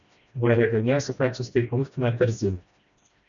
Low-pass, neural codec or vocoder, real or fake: 7.2 kHz; codec, 16 kHz, 1 kbps, FreqCodec, smaller model; fake